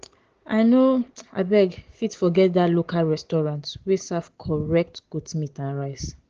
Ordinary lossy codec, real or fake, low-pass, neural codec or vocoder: Opus, 16 kbps; real; 7.2 kHz; none